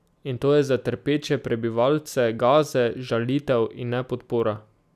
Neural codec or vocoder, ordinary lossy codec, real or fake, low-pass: none; none; real; 14.4 kHz